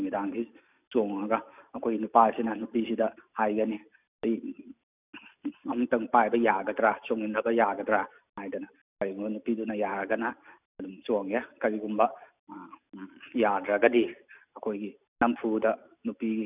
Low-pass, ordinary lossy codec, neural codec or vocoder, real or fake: 3.6 kHz; none; none; real